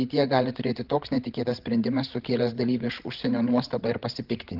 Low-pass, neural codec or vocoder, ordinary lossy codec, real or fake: 5.4 kHz; codec, 16 kHz, 16 kbps, FreqCodec, larger model; Opus, 16 kbps; fake